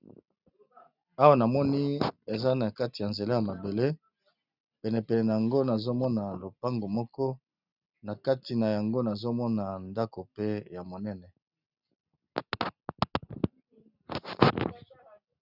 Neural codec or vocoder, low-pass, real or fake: none; 5.4 kHz; real